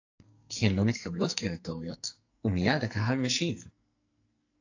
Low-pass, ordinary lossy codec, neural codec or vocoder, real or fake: 7.2 kHz; AAC, 48 kbps; codec, 44.1 kHz, 2.6 kbps, SNAC; fake